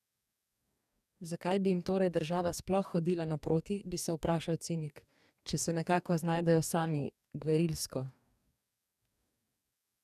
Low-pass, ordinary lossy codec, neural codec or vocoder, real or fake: 14.4 kHz; none; codec, 44.1 kHz, 2.6 kbps, DAC; fake